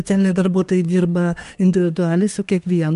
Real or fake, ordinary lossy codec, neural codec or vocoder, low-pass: fake; MP3, 64 kbps; codec, 24 kHz, 1 kbps, SNAC; 10.8 kHz